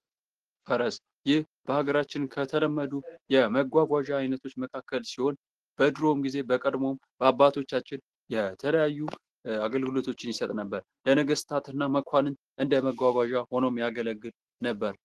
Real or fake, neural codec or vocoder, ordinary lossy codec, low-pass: real; none; Opus, 16 kbps; 10.8 kHz